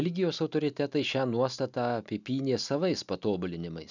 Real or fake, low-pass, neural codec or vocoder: real; 7.2 kHz; none